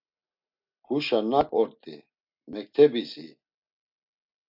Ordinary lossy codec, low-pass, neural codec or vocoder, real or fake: AAC, 48 kbps; 5.4 kHz; none; real